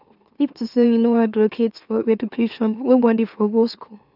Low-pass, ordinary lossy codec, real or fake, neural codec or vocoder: 5.4 kHz; none; fake; autoencoder, 44.1 kHz, a latent of 192 numbers a frame, MeloTTS